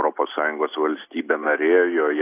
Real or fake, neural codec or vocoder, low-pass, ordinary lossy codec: real; none; 3.6 kHz; AAC, 24 kbps